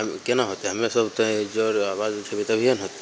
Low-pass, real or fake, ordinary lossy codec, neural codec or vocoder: none; real; none; none